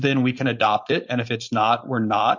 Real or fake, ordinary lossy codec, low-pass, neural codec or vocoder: fake; MP3, 48 kbps; 7.2 kHz; codec, 16 kHz, 4.8 kbps, FACodec